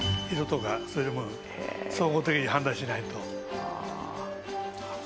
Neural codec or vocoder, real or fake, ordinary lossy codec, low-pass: none; real; none; none